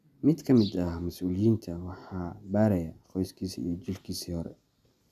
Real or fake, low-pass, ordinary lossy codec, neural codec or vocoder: real; 14.4 kHz; none; none